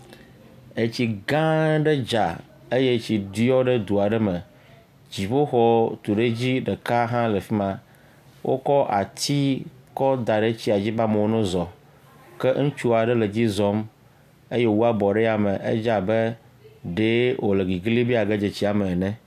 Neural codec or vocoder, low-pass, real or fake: none; 14.4 kHz; real